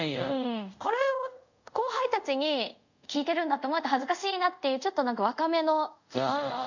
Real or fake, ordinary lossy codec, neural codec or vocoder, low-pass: fake; none; codec, 24 kHz, 0.5 kbps, DualCodec; 7.2 kHz